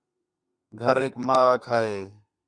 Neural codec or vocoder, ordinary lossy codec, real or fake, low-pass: codec, 32 kHz, 1.9 kbps, SNAC; Opus, 64 kbps; fake; 9.9 kHz